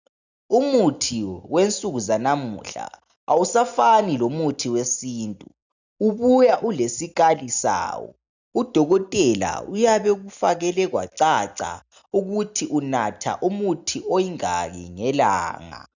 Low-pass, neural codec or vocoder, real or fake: 7.2 kHz; none; real